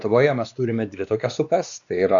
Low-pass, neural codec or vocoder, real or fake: 7.2 kHz; codec, 16 kHz, 2 kbps, X-Codec, WavLM features, trained on Multilingual LibriSpeech; fake